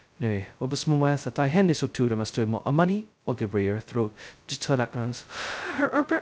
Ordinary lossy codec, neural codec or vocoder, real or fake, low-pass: none; codec, 16 kHz, 0.2 kbps, FocalCodec; fake; none